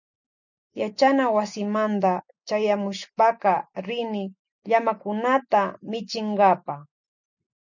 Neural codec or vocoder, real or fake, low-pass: none; real; 7.2 kHz